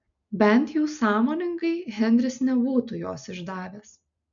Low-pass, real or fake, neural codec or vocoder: 7.2 kHz; real; none